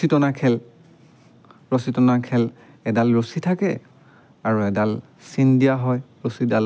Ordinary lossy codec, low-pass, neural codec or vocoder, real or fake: none; none; none; real